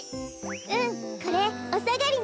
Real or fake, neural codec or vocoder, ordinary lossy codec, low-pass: real; none; none; none